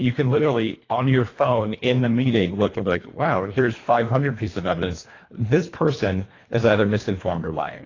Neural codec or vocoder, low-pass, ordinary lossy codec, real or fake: codec, 24 kHz, 1.5 kbps, HILCodec; 7.2 kHz; AAC, 32 kbps; fake